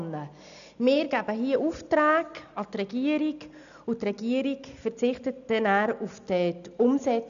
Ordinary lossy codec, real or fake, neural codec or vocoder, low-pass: none; real; none; 7.2 kHz